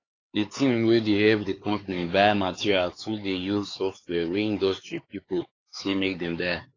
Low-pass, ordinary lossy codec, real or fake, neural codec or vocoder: 7.2 kHz; AAC, 32 kbps; fake; codec, 16 kHz, 4 kbps, X-Codec, HuBERT features, trained on LibriSpeech